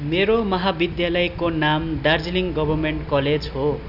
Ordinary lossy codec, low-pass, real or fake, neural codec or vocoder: none; 5.4 kHz; real; none